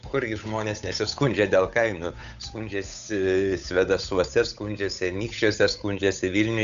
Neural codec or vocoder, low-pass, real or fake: codec, 16 kHz, 8 kbps, FunCodec, trained on Chinese and English, 25 frames a second; 7.2 kHz; fake